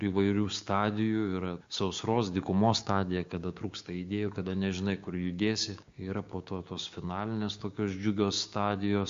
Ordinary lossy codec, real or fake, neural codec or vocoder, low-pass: MP3, 48 kbps; fake; codec, 16 kHz, 6 kbps, DAC; 7.2 kHz